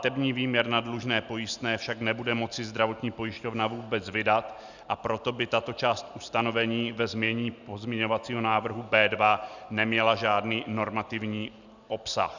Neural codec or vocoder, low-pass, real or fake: none; 7.2 kHz; real